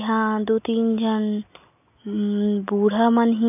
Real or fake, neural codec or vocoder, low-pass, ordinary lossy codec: real; none; 3.6 kHz; none